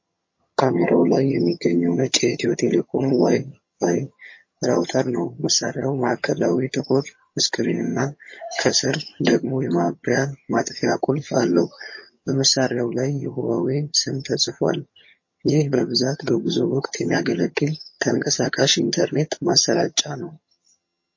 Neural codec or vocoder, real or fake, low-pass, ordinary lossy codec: vocoder, 22.05 kHz, 80 mel bands, HiFi-GAN; fake; 7.2 kHz; MP3, 32 kbps